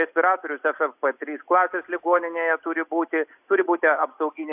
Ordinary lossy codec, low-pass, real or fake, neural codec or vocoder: AAC, 32 kbps; 3.6 kHz; real; none